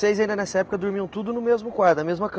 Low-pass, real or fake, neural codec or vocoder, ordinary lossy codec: none; real; none; none